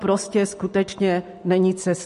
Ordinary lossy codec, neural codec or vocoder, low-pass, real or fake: MP3, 48 kbps; none; 14.4 kHz; real